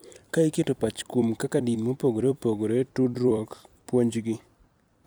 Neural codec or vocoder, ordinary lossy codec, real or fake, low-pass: vocoder, 44.1 kHz, 128 mel bands every 256 samples, BigVGAN v2; none; fake; none